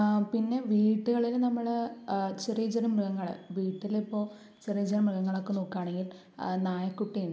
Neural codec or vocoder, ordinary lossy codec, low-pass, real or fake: none; none; none; real